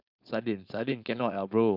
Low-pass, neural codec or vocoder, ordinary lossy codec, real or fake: 5.4 kHz; codec, 16 kHz, 4.8 kbps, FACodec; none; fake